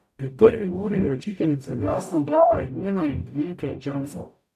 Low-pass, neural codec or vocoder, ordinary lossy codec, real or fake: 14.4 kHz; codec, 44.1 kHz, 0.9 kbps, DAC; none; fake